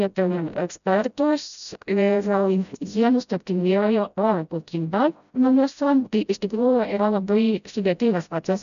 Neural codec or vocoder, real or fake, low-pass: codec, 16 kHz, 0.5 kbps, FreqCodec, smaller model; fake; 7.2 kHz